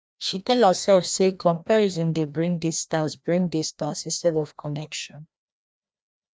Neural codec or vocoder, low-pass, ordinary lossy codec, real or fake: codec, 16 kHz, 1 kbps, FreqCodec, larger model; none; none; fake